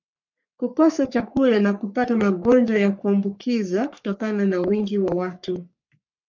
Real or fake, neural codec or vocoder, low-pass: fake; codec, 44.1 kHz, 3.4 kbps, Pupu-Codec; 7.2 kHz